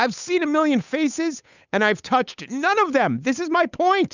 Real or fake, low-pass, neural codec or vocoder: fake; 7.2 kHz; codec, 16 kHz, 8 kbps, FunCodec, trained on Chinese and English, 25 frames a second